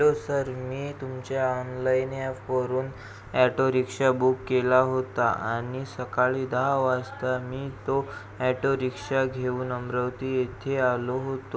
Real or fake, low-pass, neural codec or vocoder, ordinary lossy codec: real; none; none; none